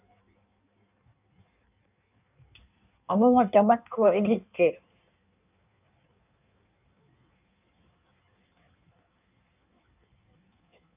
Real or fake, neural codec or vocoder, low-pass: fake; codec, 16 kHz in and 24 kHz out, 1.1 kbps, FireRedTTS-2 codec; 3.6 kHz